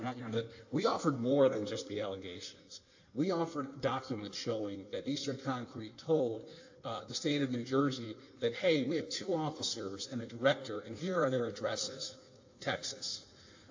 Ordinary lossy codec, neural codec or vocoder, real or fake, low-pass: AAC, 48 kbps; codec, 16 kHz in and 24 kHz out, 1.1 kbps, FireRedTTS-2 codec; fake; 7.2 kHz